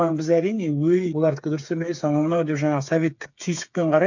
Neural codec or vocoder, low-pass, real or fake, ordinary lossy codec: codec, 16 kHz, 8 kbps, FreqCodec, smaller model; 7.2 kHz; fake; none